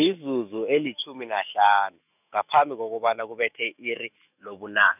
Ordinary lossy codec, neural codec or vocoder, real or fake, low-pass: none; autoencoder, 48 kHz, 128 numbers a frame, DAC-VAE, trained on Japanese speech; fake; 3.6 kHz